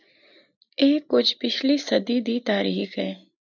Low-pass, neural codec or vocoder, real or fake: 7.2 kHz; none; real